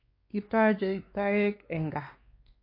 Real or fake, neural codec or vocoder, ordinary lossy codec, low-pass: fake; codec, 16 kHz, 2 kbps, X-Codec, WavLM features, trained on Multilingual LibriSpeech; MP3, 32 kbps; 5.4 kHz